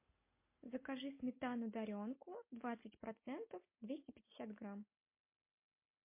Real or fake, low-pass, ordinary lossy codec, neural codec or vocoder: real; 3.6 kHz; MP3, 24 kbps; none